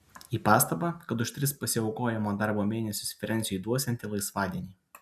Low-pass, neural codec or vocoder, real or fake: 14.4 kHz; none; real